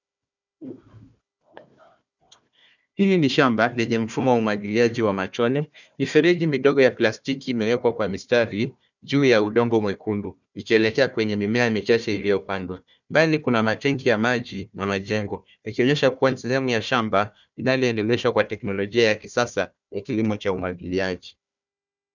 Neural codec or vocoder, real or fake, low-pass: codec, 16 kHz, 1 kbps, FunCodec, trained on Chinese and English, 50 frames a second; fake; 7.2 kHz